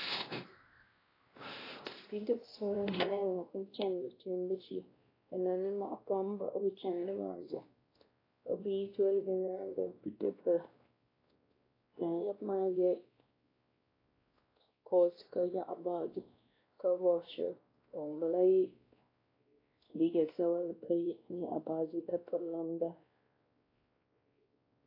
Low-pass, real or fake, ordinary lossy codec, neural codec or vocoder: 5.4 kHz; fake; AAC, 24 kbps; codec, 16 kHz, 1 kbps, X-Codec, WavLM features, trained on Multilingual LibriSpeech